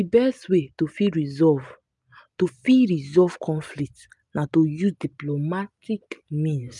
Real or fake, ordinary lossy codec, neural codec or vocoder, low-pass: real; none; none; 10.8 kHz